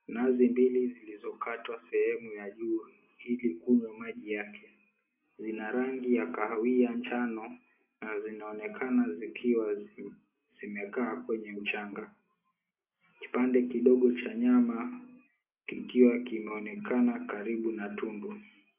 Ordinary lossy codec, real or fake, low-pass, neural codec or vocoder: AAC, 24 kbps; real; 3.6 kHz; none